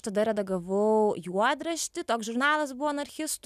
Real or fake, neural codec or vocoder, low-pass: real; none; 14.4 kHz